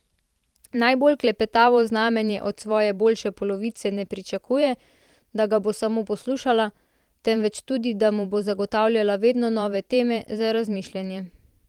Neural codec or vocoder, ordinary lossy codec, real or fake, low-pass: vocoder, 44.1 kHz, 128 mel bands every 512 samples, BigVGAN v2; Opus, 24 kbps; fake; 19.8 kHz